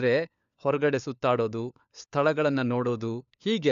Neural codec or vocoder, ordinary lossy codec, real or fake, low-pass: codec, 16 kHz, 4 kbps, FunCodec, trained on LibriTTS, 50 frames a second; AAC, 96 kbps; fake; 7.2 kHz